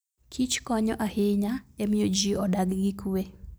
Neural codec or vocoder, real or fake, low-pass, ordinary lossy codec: none; real; none; none